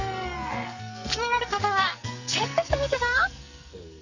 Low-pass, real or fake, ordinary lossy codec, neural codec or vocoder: 7.2 kHz; fake; none; codec, 44.1 kHz, 2.6 kbps, SNAC